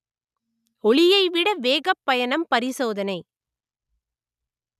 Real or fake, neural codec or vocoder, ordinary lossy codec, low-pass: real; none; none; 14.4 kHz